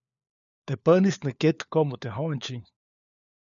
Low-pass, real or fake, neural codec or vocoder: 7.2 kHz; fake; codec, 16 kHz, 4 kbps, FunCodec, trained on LibriTTS, 50 frames a second